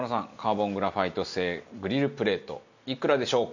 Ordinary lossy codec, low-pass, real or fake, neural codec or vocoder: none; 7.2 kHz; real; none